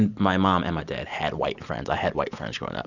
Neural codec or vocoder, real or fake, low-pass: none; real; 7.2 kHz